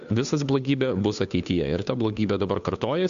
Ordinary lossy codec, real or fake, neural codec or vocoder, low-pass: AAC, 96 kbps; fake; codec, 16 kHz, 2 kbps, FunCodec, trained on Chinese and English, 25 frames a second; 7.2 kHz